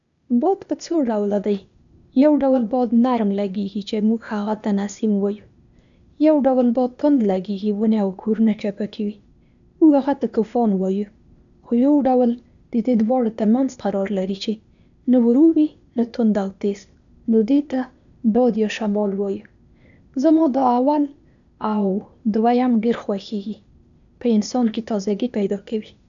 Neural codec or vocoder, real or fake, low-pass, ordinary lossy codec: codec, 16 kHz, 0.8 kbps, ZipCodec; fake; 7.2 kHz; none